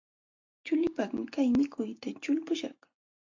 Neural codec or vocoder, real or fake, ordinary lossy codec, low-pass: none; real; AAC, 48 kbps; 7.2 kHz